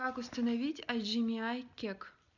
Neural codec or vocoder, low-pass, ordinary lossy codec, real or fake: none; 7.2 kHz; none; real